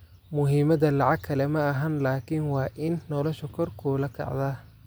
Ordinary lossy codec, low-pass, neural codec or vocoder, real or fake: none; none; none; real